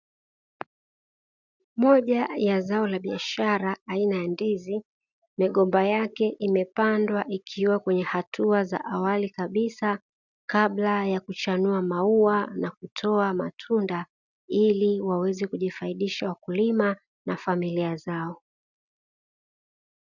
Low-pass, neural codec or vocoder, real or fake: 7.2 kHz; none; real